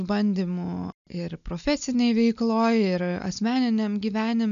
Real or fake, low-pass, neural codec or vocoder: real; 7.2 kHz; none